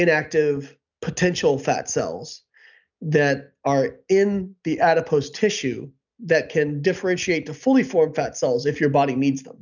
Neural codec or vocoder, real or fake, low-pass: none; real; 7.2 kHz